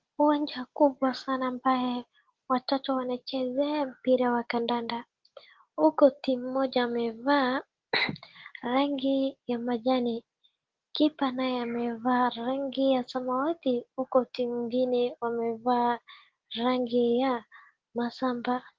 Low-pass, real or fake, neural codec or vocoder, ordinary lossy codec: 7.2 kHz; real; none; Opus, 16 kbps